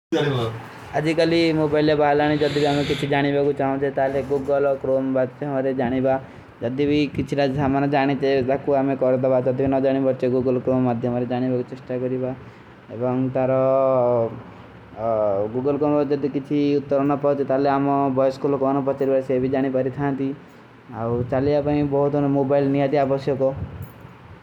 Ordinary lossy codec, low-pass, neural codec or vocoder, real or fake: none; 19.8 kHz; none; real